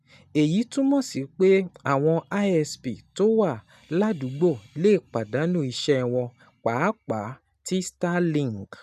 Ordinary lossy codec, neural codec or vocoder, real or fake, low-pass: none; none; real; 14.4 kHz